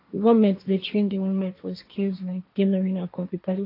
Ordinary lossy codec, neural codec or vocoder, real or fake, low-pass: AAC, 32 kbps; codec, 16 kHz, 1.1 kbps, Voila-Tokenizer; fake; 5.4 kHz